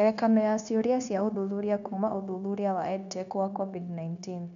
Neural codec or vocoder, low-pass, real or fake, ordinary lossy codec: codec, 16 kHz, 0.9 kbps, LongCat-Audio-Codec; 7.2 kHz; fake; none